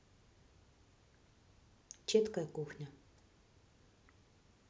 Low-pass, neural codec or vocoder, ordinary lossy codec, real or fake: none; none; none; real